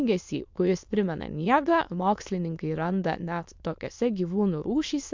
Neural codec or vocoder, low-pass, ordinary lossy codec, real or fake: autoencoder, 22.05 kHz, a latent of 192 numbers a frame, VITS, trained on many speakers; 7.2 kHz; MP3, 64 kbps; fake